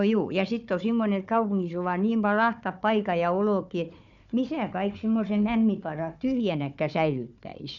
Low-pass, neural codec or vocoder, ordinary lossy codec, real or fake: 7.2 kHz; codec, 16 kHz, 4 kbps, FunCodec, trained on Chinese and English, 50 frames a second; none; fake